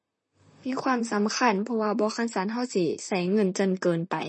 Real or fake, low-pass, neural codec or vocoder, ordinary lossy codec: fake; 10.8 kHz; vocoder, 44.1 kHz, 128 mel bands, Pupu-Vocoder; MP3, 32 kbps